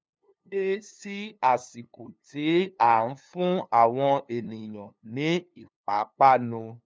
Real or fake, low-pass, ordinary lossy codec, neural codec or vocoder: fake; none; none; codec, 16 kHz, 2 kbps, FunCodec, trained on LibriTTS, 25 frames a second